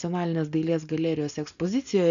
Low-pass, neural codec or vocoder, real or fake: 7.2 kHz; none; real